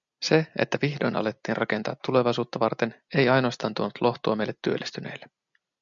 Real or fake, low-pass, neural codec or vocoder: real; 7.2 kHz; none